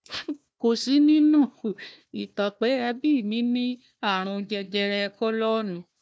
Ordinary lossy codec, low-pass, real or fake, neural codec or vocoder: none; none; fake; codec, 16 kHz, 1 kbps, FunCodec, trained on Chinese and English, 50 frames a second